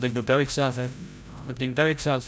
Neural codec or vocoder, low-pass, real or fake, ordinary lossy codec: codec, 16 kHz, 0.5 kbps, FreqCodec, larger model; none; fake; none